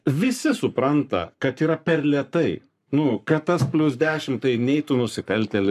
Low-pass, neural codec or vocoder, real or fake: 14.4 kHz; codec, 44.1 kHz, 7.8 kbps, Pupu-Codec; fake